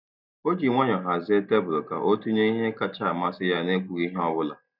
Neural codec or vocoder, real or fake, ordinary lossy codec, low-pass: none; real; none; 5.4 kHz